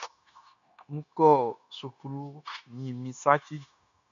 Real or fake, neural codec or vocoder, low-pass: fake; codec, 16 kHz, 0.9 kbps, LongCat-Audio-Codec; 7.2 kHz